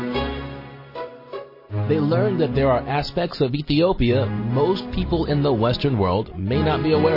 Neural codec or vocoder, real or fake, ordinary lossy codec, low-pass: none; real; MP3, 24 kbps; 5.4 kHz